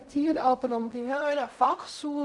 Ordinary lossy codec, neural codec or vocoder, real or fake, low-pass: none; codec, 16 kHz in and 24 kHz out, 0.4 kbps, LongCat-Audio-Codec, fine tuned four codebook decoder; fake; 10.8 kHz